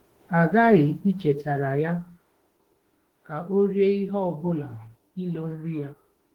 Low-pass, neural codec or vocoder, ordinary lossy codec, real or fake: 19.8 kHz; autoencoder, 48 kHz, 32 numbers a frame, DAC-VAE, trained on Japanese speech; Opus, 16 kbps; fake